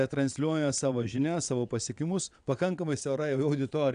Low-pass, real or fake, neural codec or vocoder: 9.9 kHz; fake; vocoder, 22.05 kHz, 80 mel bands, WaveNeXt